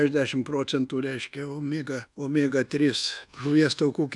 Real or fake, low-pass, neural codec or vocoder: fake; 10.8 kHz; codec, 24 kHz, 1.2 kbps, DualCodec